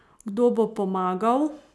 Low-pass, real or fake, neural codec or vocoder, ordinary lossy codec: none; real; none; none